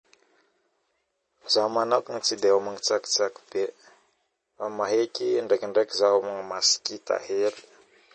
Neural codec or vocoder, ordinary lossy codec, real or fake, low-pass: none; MP3, 32 kbps; real; 10.8 kHz